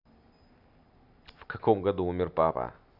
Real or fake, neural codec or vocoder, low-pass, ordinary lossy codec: real; none; 5.4 kHz; none